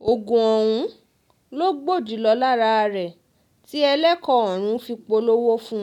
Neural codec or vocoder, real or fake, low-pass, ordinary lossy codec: none; real; 19.8 kHz; none